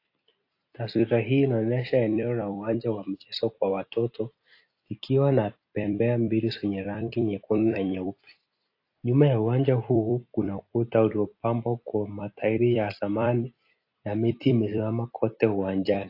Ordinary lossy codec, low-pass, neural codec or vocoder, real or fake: AAC, 32 kbps; 5.4 kHz; vocoder, 44.1 kHz, 128 mel bands every 256 samples, BigVGAN v2; fake